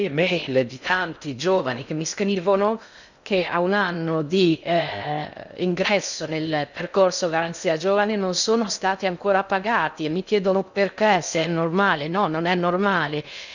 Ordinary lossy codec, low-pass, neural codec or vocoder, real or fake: none; 7.2 kHz; codec, 16 kHz in and 24 kHz out, 0.6 kbps, FocalCodec, streaming, 2048 codes; fake